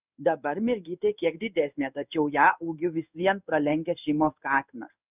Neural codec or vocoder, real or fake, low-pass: codec, 16 kHz in and 24 kHz out, 1 kbps, XY-Tokenizer; fake; 3.6 kHz